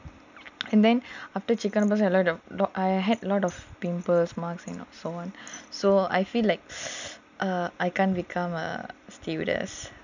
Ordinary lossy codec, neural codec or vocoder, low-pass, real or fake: none; none; 7.2 kHz; real